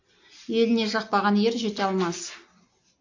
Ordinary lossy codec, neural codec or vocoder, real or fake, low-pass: AAC, 48 kbps; none; real; 7.2 kHz